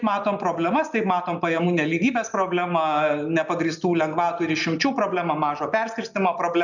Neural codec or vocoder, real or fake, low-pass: none; real; 7.2 kHz